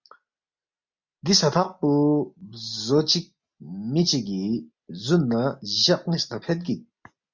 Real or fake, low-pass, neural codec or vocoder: real; 7.2 kHz; none